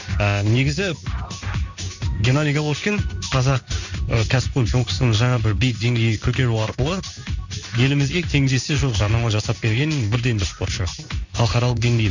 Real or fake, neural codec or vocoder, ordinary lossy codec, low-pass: fake; codec, 16 kHz in and 24 kHz out, 1 kbps, XY-Tokenizer; none; 7.2 kHz